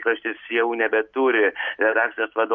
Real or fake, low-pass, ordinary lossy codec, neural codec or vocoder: real; 7.2 kHz; MP3, 48 kbps; none